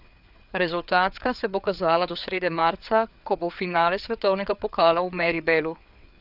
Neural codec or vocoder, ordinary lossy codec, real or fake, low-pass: codec, 16 kHz, 4 kbps, FreqCodec, larger model; none; fake; 5.4 kHz